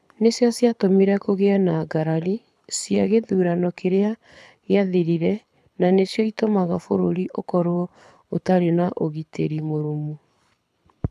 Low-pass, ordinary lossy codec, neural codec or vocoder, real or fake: none; none; codec, 24 kHz, 6 kbps, HILCodec; fake